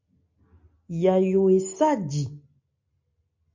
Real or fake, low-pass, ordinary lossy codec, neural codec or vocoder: real; 7.2 kHz; MP3, 48 kbps; none